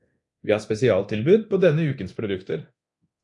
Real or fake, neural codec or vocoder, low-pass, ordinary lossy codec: fake; codec, 24 kHz, 0.9 kbps, DualCodec; 10.8 kHz; AAC, 64 kbps